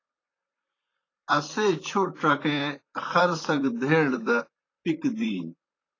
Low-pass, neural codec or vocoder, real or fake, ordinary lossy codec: 7.2 kHz; vocoder, 44.1 kHz, 128 mel bands, Pupu-Vocoder; fake; AAC, 32 kbps